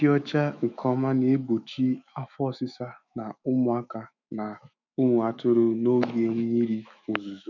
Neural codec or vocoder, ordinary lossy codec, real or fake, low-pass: autoencoder, 48 kHz, 128 numbers a frame, DAC-VAE, trained on Japanese speech; none; fake; 7.2 kHz